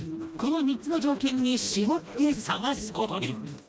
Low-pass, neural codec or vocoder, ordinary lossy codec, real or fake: none; codec, 16 kHz, 1 kbps, FreqCodec, smaller model; none; fake